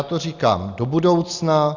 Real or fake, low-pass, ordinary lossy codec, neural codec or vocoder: real; 7.2 kHz; Opus, 64 kbps; none